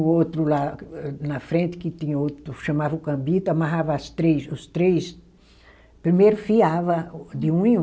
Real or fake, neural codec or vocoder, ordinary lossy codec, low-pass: real; none; none; none